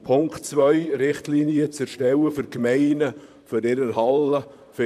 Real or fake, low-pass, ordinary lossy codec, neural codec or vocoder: fake; 14.4 kHz; MP3, 96 kbps; vocoder, 44.1 kHz, 128 mel bands, Pupu-Vocoder